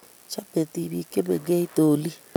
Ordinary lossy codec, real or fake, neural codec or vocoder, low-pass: none; real; none; none